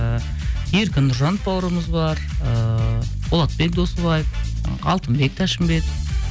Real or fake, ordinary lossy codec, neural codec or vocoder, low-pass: real; none; none; none